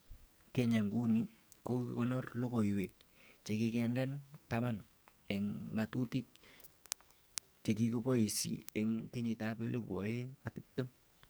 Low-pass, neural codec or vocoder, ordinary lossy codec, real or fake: none; codec, 44.1 kHz, 2.6 kbps, SNAC; none; fake